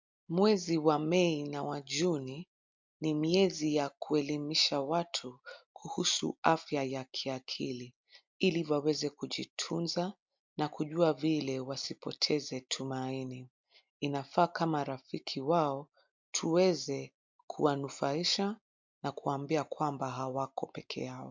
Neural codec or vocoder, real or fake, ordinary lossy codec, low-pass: none; real; MP3, 64 kbps; 7.2 kHz